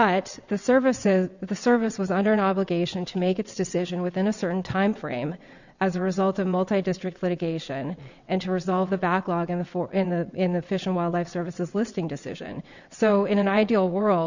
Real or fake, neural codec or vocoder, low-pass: fake; vocoder, 22.05 kHz, 80 mel bands, WaveNeXt; 7.2 kHz